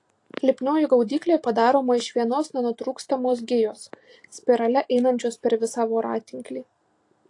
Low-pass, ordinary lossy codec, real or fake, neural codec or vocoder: 10.8 kHz; AAC, 48 kbps; real; none